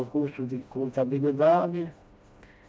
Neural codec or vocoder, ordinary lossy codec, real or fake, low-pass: codec, 16 kHz, 1 kbps, FreqCodec, smaller model; none; fake; none